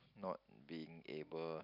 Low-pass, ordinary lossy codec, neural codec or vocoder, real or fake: 5.4 kHz; none; none; real